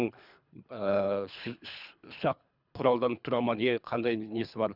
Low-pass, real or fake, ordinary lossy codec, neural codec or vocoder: 5.4 kHz; fake; none; codec, 24 kHz, 3 kbps, HILCodec